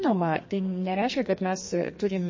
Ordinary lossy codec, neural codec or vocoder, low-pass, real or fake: MP3, 32 kbps; codec, 44.1 kHz, 2.6 kbps, SNAC; 7.2 kHz; fake